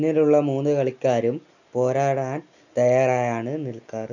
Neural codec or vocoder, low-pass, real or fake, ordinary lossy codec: none; 7.2 kHz; real; none